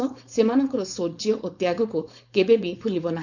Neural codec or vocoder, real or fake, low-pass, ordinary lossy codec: codec, 16 kHz, 4.8 kbps, FACodec; fake; 7.2 kHz; none